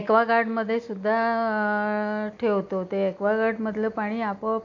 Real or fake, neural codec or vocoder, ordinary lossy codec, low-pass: real; none; AAC, 48 kbps; 7.2 kHz